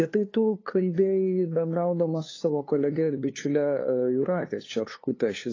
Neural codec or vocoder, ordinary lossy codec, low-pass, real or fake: codec, 16 kHz, 2 kbps, FunCodec, trained on LibriTTS, 25 frames a second; AAC, 32 kbps; 7.2 kHz; fake